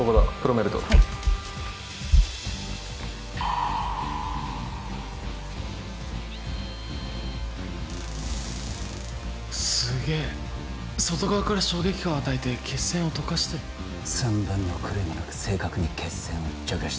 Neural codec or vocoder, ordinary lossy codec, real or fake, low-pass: none; none; real; none